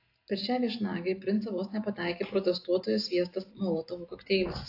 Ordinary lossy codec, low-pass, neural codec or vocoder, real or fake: AAC, 32 kbps; 5.4 kHz; none; real